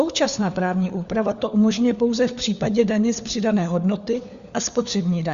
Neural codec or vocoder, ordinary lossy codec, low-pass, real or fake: codec, 16 kHz, 4 kbps, FunCodec, trained on LibriTTS, 50 frames a second; Opus, 64 kbps; 7.2 kHz; fake